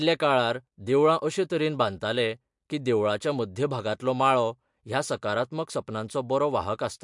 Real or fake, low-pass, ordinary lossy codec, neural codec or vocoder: real; 10.8 kHz; MP3, 64 kbps; none